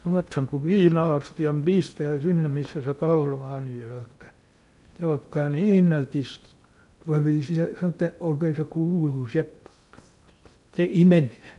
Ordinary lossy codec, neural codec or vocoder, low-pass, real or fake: none; codec, 16 kHz in and 24 kHz out, 0.6 kbps, FocalCodec, streaming, 2048 codes; 10.8 kHz; fake